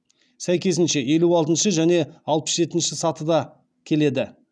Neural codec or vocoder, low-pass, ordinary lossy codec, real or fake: none; 9.9 kHz; none; real